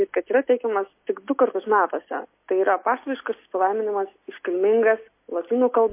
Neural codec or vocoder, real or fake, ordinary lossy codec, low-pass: none; real; MP3, 24 kbps; 3.6 kHz